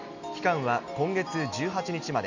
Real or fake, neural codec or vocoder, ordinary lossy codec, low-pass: real; none; none; 7.2 kHz